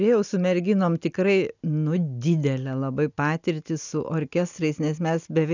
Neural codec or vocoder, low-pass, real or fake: none; 7.2 kHz; real